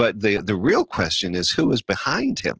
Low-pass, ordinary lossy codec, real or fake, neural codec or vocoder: 7.2 kHz; Opus, 16 kbps; real; none